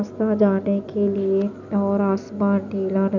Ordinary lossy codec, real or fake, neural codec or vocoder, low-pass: none; real; none; 7.2 kHz